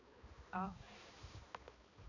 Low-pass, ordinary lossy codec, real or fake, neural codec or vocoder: 7.2 kHz; none; fake; codec, 16 kHz, 2 kbps, X-Codec, HuBERT features, trained on balanced general audio